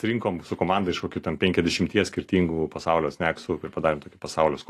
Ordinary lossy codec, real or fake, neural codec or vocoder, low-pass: AAC, 48 kbps; real; none; 14.4 kHz